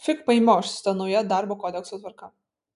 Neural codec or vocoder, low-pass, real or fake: none; 10.8 kHz; real